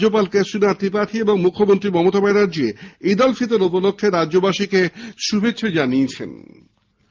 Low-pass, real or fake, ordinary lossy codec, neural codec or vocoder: 7.2 kHz; real; Opus, 32 kbps; none